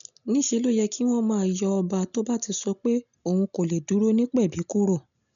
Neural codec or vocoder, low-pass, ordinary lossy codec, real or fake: none; 7.2 kHz; MP3, 96 kbps; real